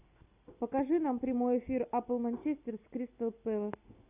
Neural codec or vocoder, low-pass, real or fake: none; 3.6 kHz; real